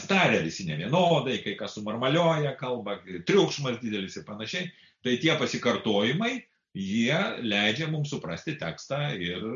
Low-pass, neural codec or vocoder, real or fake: 7.2 kHz; none; real